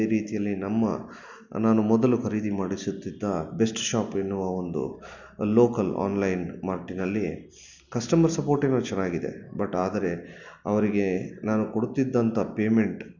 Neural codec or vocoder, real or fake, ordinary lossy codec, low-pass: none; real; none; 7.2 kHz